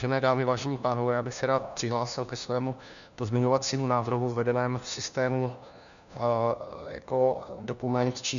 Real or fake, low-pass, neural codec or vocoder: fake; 7.2 kHz; codec, 16 kHz, 1 kbps, FunCodec, trained on LibriTTS, 50 frames a second